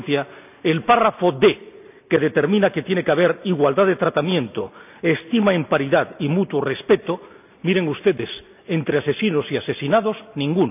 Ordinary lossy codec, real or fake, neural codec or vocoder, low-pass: none; real; none; 3.6 kHz